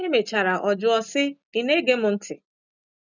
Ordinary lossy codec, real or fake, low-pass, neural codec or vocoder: none; real; 7.2 kHz; none